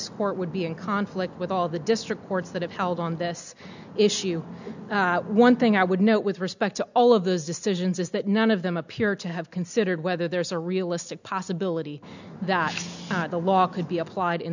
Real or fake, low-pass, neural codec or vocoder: real; 7.2 kHz; none